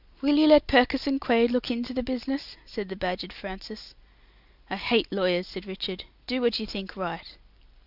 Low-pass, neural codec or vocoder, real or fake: 5.4 kHz; none; real